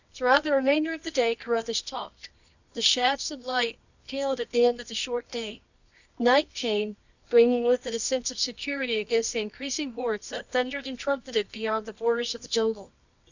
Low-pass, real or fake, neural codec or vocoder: 7.2 kHz; fake; codec, 24 kHz, 0.9 kbps, WavTokenizer, medium music audio release